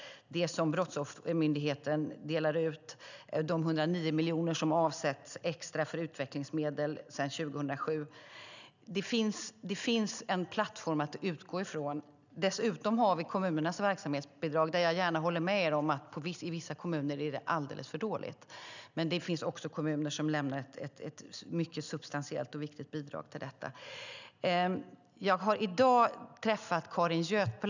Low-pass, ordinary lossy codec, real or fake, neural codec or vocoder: 7.2 kHz; none; real; none